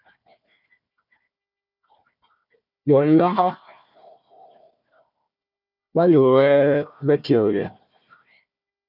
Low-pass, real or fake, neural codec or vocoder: 5.4 kHz; fake; codec, 16 kHz, 1 kbps, FunCodec, trained on Chinese and English, 50 frames a second